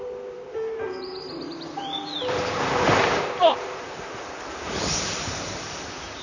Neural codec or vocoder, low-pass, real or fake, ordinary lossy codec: vocoder, 44.1 kHz, 128 mel bands, Pupu-Vocoder; 7.2 kHz; fake; none